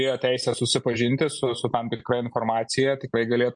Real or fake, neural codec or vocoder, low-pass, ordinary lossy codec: real; none; 9.9 kHz; MP3, 48 kbps